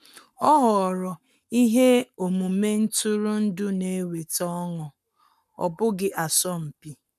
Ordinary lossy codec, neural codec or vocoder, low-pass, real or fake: none; codec, 44.1 kHz, 7.8 kbps, Pupu-Codec; 14.4 kHz; fake